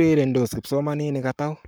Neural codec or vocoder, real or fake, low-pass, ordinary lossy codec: codec, 44.1 kHz, 7.8 kbps, Pupu-Codec; fake; none; none